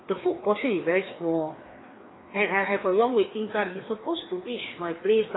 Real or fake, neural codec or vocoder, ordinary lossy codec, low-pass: fake; codec, 16 kHz, 2 kbps, FreqCodec, larger model; AAC, 16 kbps; 7.2 kHz